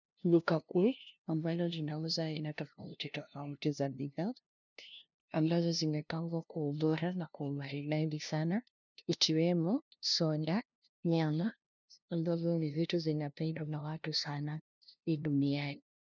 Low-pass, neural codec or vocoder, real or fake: 7.2 kHz; codec, 16 kHz, 0.5 kbps, FunCodec, trained on LibriTTS, 25 frames a second; fake